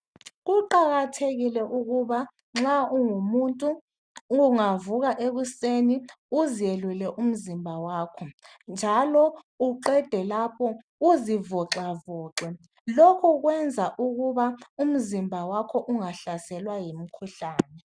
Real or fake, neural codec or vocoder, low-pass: real; none; 9.9 kHz